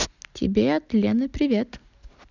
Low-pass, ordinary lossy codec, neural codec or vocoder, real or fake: 7.2 kHz; none; none; real